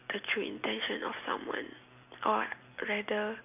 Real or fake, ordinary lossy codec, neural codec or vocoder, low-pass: real; none; none; 3.6 kHz